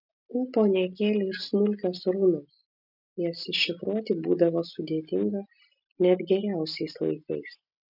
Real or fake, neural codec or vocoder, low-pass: real; none; 5.4 kHz